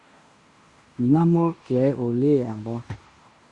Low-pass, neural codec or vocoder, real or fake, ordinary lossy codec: 10.8 kHz; codec, 16 kHz in and 24 kHz out, 0.9 kbps, LongCat-Audio-Codec, fine tuned four codebook decoder; fake; Opus, 64 kbps